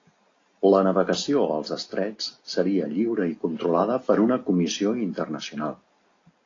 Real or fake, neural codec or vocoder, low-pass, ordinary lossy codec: real; none; 7.2 kHz; AAC, 32 kbps